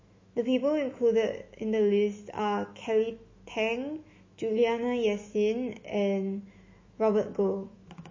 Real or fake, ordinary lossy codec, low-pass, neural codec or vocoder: fake; MP3, 32 kbps; 7.2 kHz; autoencoder, 48 kHz, 128 numbers a frame, DAC-VAE, trained on Japanese speech